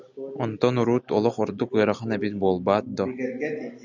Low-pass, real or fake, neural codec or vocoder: 7.2 kHz; real; none